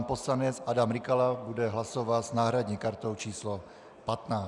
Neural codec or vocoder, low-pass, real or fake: none; 10.8 kHz; real